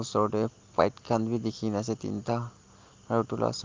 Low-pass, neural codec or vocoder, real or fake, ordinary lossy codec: 7.2 kHz; none; real; Opus, 32 kbps